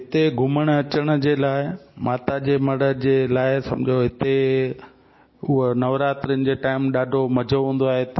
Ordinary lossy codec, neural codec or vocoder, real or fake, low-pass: MP3, 24 kbps; none; real; 7.2 kHz